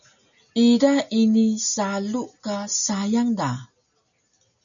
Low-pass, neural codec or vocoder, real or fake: 7.2 kHz; none; real